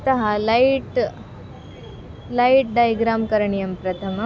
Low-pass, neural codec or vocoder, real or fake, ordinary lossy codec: none; none; real; none